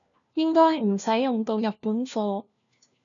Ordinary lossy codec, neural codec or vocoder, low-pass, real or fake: MP3, 96 kbps; codec, 16 kHz, 1 kbps, FunCodec, trained on LibriTTS, 50 frames a second; 7.2 kHz; fake